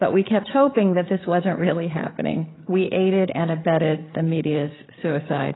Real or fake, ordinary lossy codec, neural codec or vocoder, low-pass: fake; AAC, 16 kbps; codec, 16 kHz, 8 kbps, FreqCodec, larger model; 7.2 kHz